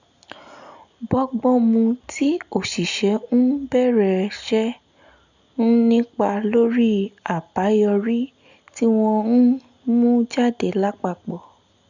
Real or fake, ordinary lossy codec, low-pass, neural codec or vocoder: real; none; 7.2 kHz; none